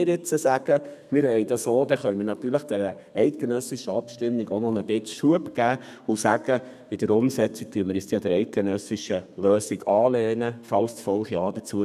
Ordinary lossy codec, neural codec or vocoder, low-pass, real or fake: none; codec, 32 kHz, 1.9 kbps, SNAC; 14.4 kHz; fake